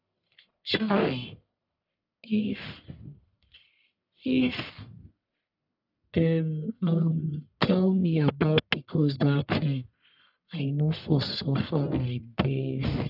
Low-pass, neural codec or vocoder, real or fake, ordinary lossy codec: 5.4 kHz; codec, 44.1 kHz, 1.7 kbps, Pupu-Codec; fake; none